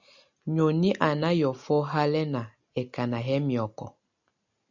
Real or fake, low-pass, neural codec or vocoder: real; 7.2 kHz; none